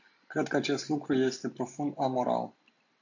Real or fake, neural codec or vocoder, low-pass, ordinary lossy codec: real; none; 7.2 kHz; AAC, 48 kbps